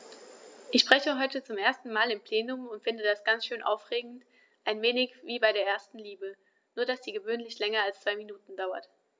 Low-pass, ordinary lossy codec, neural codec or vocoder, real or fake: none; none; none; real